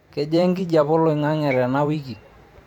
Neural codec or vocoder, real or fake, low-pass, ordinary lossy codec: vocoder, 44.1 kHz, 128 mel bands every 512 samples, BigVGAN v2; fake; 19.8 kHz; none